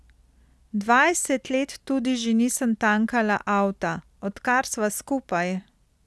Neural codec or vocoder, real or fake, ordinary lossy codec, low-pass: none; real; none; none